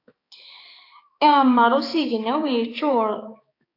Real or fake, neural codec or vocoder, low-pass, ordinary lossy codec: fake; codec, 16 kHz, 4 kbps, X-Codec, HuBERT features, trained on balanced general audio; 5.4 kHz; AAC, 32 kbps